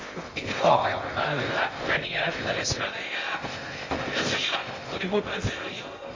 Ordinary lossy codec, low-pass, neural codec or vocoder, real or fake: MP3, 32 kbps; 7.2 kHz; codec, 16 kHz in and 24 kHz out, 0.6 kbps, FocalCodec, streaming, 2048 codes; fake